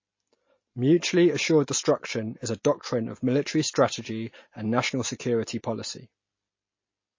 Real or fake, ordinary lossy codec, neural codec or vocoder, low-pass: real; MP3, 32 kbps; none; 7.2 kHz